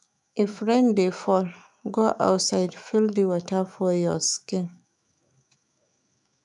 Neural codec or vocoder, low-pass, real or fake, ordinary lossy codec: autoencoder, 48 kHz, 128 numbers a frame, DAC-VAE, trained on Japanese speech; 10.8 kHz; fake; none